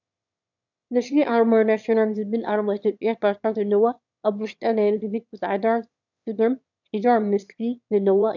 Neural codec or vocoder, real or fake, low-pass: autoencoder, 22.05 kHz, a latent of 192 numbers a frame, VITS, trained on one speaker; fake; 7.2 kHz